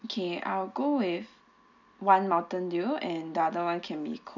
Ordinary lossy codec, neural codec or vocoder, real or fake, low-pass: none; none; real; 7.2 kHz